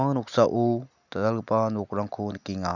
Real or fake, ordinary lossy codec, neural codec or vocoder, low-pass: real; none; none; 7.2 kHz